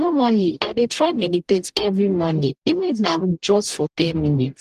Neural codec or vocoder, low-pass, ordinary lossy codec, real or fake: codec, 44.1 kHz, 0.9 kbps, DAC; 14.4 kHz; Opus, 24 kbps; fake